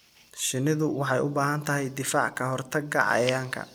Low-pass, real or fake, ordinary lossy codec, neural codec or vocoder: none; real; none; none